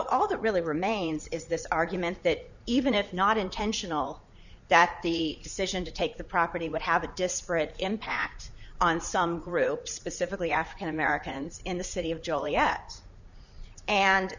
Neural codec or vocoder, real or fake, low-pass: vocoder, 44.1 kHz, 80 mel bands, Vocos; fake; 7.2 kHz